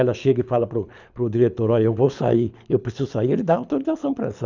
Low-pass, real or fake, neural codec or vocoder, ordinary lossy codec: 7.2 kHz; fake; codec, 24 kHz, 6 kbps, HILCodec; none